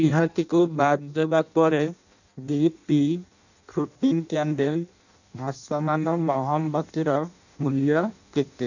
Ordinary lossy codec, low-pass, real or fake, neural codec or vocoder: none; 7.2 kHz; fake; codec, 16 kHz in and 24 kHz out, 0.6 kbps, FireRedTTS-2 codec